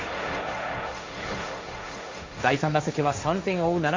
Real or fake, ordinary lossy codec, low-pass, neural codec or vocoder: fake; MP3, 48 kbps; 7.2 kHz; codec, 16 kHz, 1.1 kbps, Voila-Tokenizer